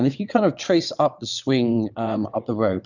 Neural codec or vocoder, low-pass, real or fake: vocoder, 22.05 kHz, 80 mel bands, WaveNeXt; 7.2 kHz; fake